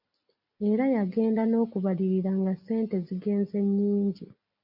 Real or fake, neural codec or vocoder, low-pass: real; none; 5.4 kHz